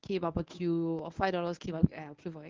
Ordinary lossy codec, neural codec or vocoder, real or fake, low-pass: Opus, 24 kbps; codec, 24 kHz, 0.9 kbps, WavTokenizer, medium speech release version 1; fake; 7.2 kHz